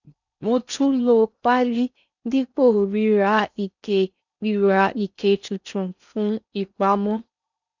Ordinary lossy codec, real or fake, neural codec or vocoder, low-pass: none; fake; codec, 16 kHz in and 24 kHz out, 0.6 kbps, FocalCodec, streaming, 4096 codes; 7.2 kHz